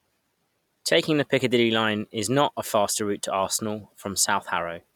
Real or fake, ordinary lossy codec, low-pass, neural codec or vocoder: real; none; 19.8 kHz; none